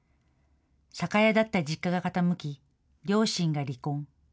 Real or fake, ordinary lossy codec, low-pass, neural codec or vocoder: real; none; none; none